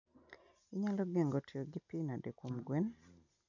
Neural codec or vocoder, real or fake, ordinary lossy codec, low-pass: none; real; none; 7.2 kHz